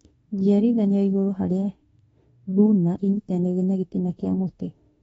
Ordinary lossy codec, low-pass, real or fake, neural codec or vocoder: AAC, 24 kbps; 19.8 kHz; fake; autoencoder, 48 kHz, 32 numbers a frame, DAC-VAE, trained on Japanese speech